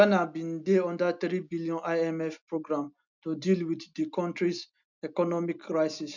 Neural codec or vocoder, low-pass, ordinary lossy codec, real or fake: none; 7.2 kHz; none; real